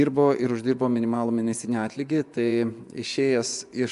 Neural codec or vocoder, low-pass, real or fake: vocoder, 24 kHz, 100 mel bands, Vocos; 10.8 kHz; fake